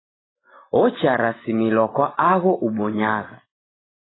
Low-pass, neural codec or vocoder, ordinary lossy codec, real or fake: 7.2 kHz; none; AAC, 16 kbps; real